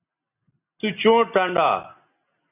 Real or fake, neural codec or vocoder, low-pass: real; none; 3.6 kHz